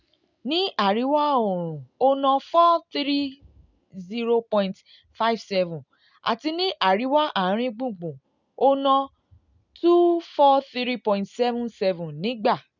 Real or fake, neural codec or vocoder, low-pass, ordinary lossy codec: real; none; 7.2 kHz; none